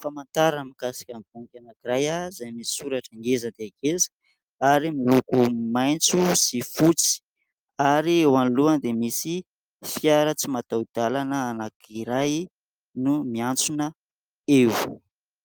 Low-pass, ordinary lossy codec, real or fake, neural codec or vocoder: 19.8 kHz; Opus, 32 kbps; real; none